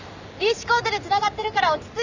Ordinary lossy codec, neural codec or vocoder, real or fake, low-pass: none; none; real; 7.2 kHz